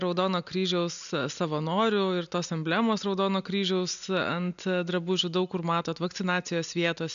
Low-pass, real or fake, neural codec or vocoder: 7.2 kHz; real; none